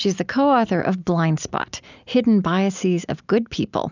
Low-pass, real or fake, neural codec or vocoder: 7.2 kHz; real; none